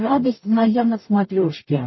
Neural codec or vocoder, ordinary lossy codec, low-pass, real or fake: codec, 44.1 kHz, 0.9 kbps, DAC; MP3, 24 kbps; 7.2 kHz; fake